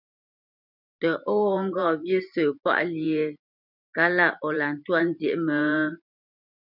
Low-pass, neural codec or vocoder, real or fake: 5.4 kHz; vocoder, 44.1 kHz, 128 mel bands every 512 samples, BigVGAN v2; fake